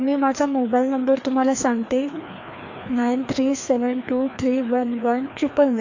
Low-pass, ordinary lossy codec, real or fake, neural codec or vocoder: 7.2 kHz; AAC, 48 kbps; fake; codec, 16 kHz, 2 kbps, FreqCodec, larger model